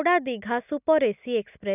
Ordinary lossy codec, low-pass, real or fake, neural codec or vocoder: none; 3.6 kHz; real; none